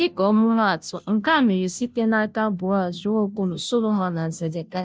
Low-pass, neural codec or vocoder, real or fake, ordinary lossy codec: none; codec, 16 kHz, 0.5 kbps, FunCodec, trained on Chinese and English, 25 frames a second; fake; none